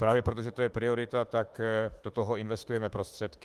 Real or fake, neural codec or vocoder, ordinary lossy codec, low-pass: fake; autoencoder, 48 kHz, 32 numbers a frame, DAC-VAE, trained on Japanese speech; Opus, 24 kbps; 14.4 kHz